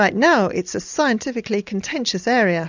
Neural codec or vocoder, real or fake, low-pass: none; real; 7.2 kHz